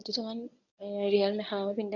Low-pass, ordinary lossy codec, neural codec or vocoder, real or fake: 7.2 kHz; none; codec, 24 kHz, 0.9 kbps, WavTokenizer, medium speech release version 1; fake